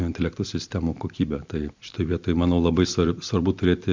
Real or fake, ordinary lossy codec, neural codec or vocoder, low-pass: real; MP3, 64 kbps; none; 7.2 kHz